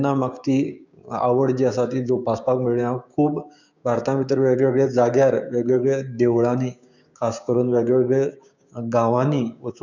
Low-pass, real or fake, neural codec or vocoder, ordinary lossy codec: 7.2 kHz; fake; codec, 16 kHz, 6 kbps, DAC; none